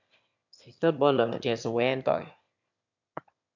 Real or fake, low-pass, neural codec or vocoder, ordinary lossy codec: fake; 7.2 kHz; autoencoder, 22.05 kHz, a latent of 192 numbers a frame, VITS, trained on one speaker; MP3, 64 kbps